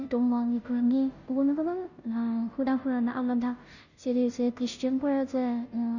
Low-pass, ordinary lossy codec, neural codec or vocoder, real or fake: 7.2 kHz; none; codec, 16 kHz, 0.5 kbps, FunCodec, trained on Chinese and English, 25 frames a second; fake